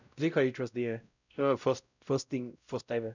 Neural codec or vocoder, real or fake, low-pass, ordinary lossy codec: codec, 16 kHz, 0.5 kbps, X-Codec, WavLM features, trained on Multilingual LibriSpeech; fake; 7.2 kHz; none